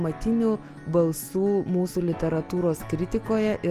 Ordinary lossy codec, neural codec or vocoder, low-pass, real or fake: Opus, 32 kbps; none; 14.4 kHz; real